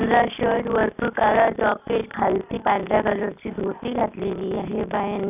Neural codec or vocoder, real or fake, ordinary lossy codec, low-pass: none; real; none; 3.6 kHz